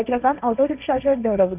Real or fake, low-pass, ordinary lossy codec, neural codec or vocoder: fake; 3.6 kHz; none; codec, 16 kHz, 1.1 kbps, Voila-Tokenizer